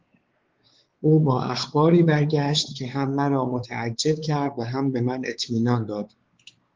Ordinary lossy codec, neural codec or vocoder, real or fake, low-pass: Opus, 16 kbps; codec, 16 kHz, 4 kbps, X-Codec, WavLM features, trained on Multilingual LibriSpeech; fake; 7.2 kHz